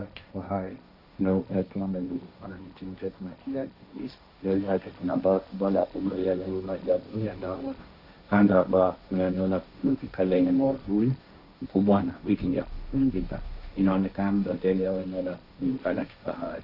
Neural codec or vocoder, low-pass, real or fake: codec, 16 kHz, 1.1 kbps, Voila-Tokenizer; 5.4 kHz; fake